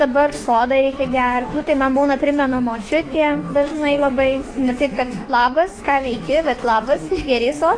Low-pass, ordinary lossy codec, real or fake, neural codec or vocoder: 9.9 kHz; AAC, 32 kbps; fake; autoencoder, 48 kHz, 32 numbers a frame, DAC-VAE, trained on Japanese speech